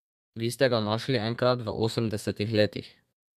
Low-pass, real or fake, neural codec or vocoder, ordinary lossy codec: 14.4 kHz; fake; codec, 32 kHz, 1.9 kbps, SNAC; none